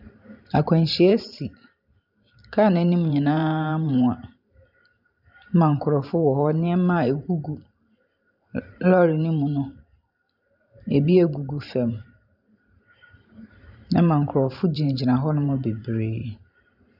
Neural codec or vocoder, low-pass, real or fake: vocoder, 44.1 kHz, 128 mel bands every 512 samples, BigVGAN v2; 5.4 kHz; fake